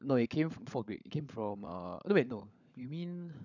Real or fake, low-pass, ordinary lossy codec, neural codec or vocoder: fake; 7.2 kHz; none; codec, 16 kHz, 16 kbps, FunCodec, trained on LibriTTS, 50 frames a second